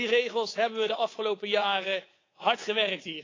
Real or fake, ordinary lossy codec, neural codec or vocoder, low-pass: fake; AAC, 32 kbps; codec, 24 kHz, 3.1 kbps, DualCodec; 7.2 kHz